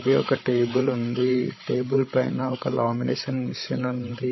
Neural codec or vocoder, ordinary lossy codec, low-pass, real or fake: codec, 16 kHz, 16 kbps, FreqCodec, larger model; MP3, 24 kbps; 7.2 kHz; fake